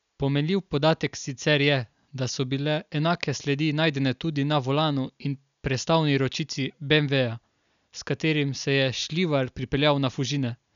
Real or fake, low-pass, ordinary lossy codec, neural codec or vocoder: real; 7.2 kHz; none; none